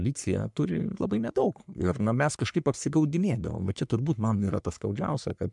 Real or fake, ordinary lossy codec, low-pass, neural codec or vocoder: fake; MP3, 96 kbps; 10.8 kHz; codec, 44.1 kHz, 3.4 kbps, Pupu-Codec